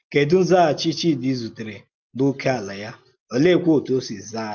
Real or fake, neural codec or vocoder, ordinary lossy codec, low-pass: real; none; Opus, 24 kbps; 7.2 kHz